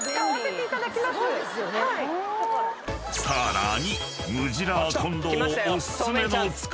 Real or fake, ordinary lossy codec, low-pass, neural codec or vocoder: real; none; none; none